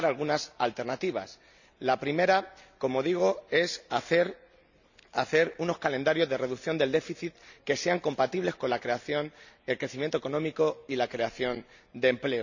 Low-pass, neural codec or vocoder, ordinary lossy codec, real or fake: 7.2 kHz; none; none; real